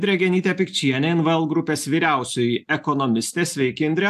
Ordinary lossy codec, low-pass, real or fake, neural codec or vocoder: AAC, 96 kbps; 14.4 kHz; real; none